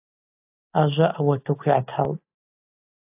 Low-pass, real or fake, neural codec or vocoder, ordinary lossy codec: 3.6 kHz; real; none; AAC, 32 kbps